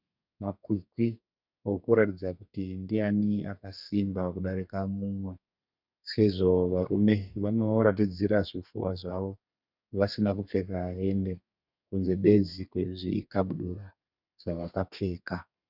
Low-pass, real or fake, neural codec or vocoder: 5.4 kHz; fake; codec, 32 kHz, 1.9 kbps, SNAC